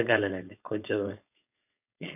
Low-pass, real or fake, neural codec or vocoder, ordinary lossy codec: 3.6 kHz; fake; codec, 16 kHz, 4.8 kbps, FACodec; none